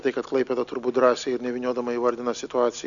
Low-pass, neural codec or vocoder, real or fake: 7.2 kHz; none; real